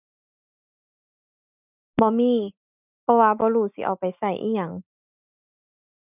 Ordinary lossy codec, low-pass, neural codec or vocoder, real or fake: none; 3.6 kHz; none; real